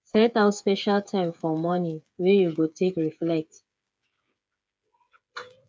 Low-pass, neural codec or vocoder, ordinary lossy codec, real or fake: none; codec, 16 kHz, 8 kbps, FreqCodec, smaller model; none; fake